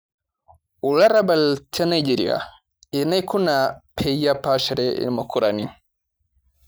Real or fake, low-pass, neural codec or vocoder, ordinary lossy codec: real; none; none; none